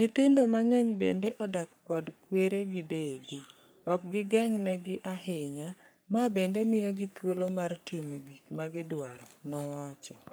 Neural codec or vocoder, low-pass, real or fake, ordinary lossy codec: codec, 44.1 kHz, 3.4 kbps, Pupu-Codec; none; fake; none